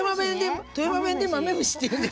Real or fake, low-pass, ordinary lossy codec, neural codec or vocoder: real; none; none; none